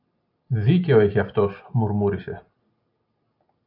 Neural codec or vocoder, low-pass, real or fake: none; 5.4 kHz; real